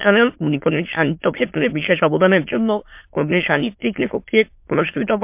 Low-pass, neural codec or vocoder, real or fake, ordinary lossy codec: 3.6 kHz; autoencoder, 22.05 kHz, a latent of 192 numbers a frame, VITS, trained on many speakers; fake; MP3, 32 kbps